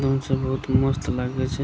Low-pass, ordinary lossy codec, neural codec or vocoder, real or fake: none; none; none; real